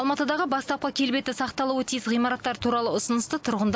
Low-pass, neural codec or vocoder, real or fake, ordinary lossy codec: none; none; real; none